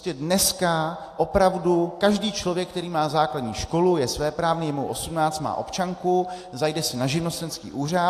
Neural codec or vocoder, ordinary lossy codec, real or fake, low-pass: none; AAC, 64 kbps; real; 14.4 kHz